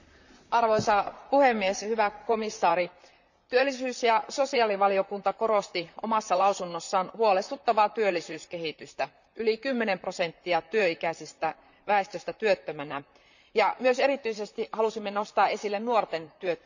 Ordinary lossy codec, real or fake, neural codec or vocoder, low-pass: none; fake; vocoder, 44.1 kHz, 128 mel bands, Pupu-Vocoder; 7.2 kHz